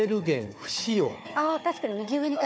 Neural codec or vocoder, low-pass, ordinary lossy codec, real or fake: codec, 16 kHz, 4 kbps, FunCodec, trained on Chinese and English, 50 frames a second; none; none; fake